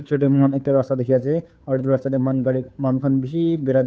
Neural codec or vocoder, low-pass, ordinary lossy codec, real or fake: codec, 16 kHz, 2 kbps, FunCodec, trained on Chinese and English, 25 frames a second; none; none; fake